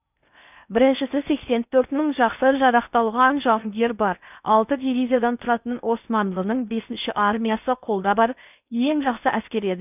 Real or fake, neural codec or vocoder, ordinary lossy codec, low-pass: fake; codec, 16 kHz in and 24 kHz out, 0.6 kbps, FocalCodec, streaming, 4096 codes; none; 3.6 kHz